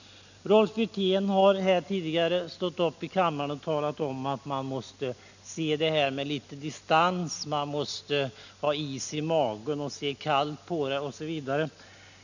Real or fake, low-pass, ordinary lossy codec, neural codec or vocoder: real; 7.2 kHz; none; none